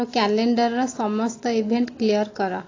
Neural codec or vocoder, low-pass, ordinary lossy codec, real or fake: none; 7.2 kHz; AAC, 32 kbps; real